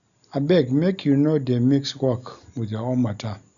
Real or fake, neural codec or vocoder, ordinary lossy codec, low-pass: real; none; none; 7.2 kHz